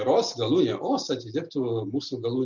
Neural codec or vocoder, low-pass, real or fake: none; 7.2 kHz; real